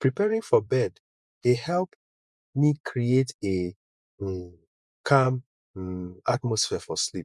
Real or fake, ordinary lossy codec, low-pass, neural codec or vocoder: fake; none; none; vocoder, 24 kHz, 100 mel bands, Vocos